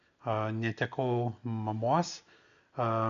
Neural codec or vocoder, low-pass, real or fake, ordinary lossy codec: none; 7.2 kHz; real; MP3, 96 kbps